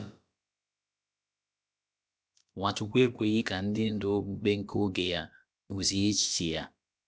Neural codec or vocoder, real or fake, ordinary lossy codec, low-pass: codec, 16 kHz, about 1 kbps, DyCAST, with the encoder's durations; fake; none; none